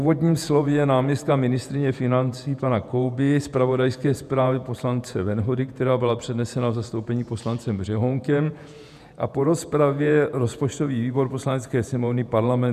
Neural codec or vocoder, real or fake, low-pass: vocoder, 48 kHz, 128 mel bands, Vocos; fake; 14.4 kHz